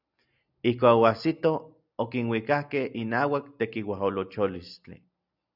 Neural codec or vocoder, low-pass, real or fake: none; 5.4 kHz; real